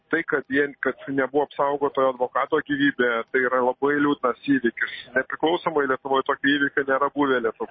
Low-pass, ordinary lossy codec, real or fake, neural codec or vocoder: 7.2 kHz; MP3, 24 kbps; real; none